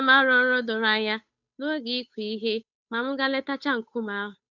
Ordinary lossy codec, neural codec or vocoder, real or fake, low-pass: none; codec, 16 kHz, 8 kbps, FunCodec, trained on Chinese and English, 25 frames a second; fake; 7.2 kHz